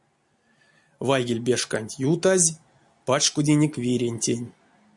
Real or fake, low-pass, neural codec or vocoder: real; 10.8 kHz; none